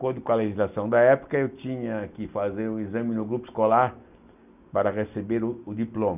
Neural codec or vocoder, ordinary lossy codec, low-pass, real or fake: none; none; 3.6 kHz; real